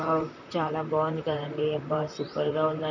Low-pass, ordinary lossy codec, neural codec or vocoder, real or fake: 7.2 kHz; none; vocoder, 44.1 kHz, 128 mel bands, Pupu-Vocoder; fake